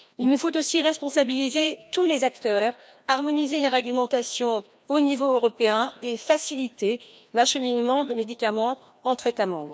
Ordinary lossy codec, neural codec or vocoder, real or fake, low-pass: none; codec, 16 kHz, 1 kbps, FreqCodec, larger model; fake; none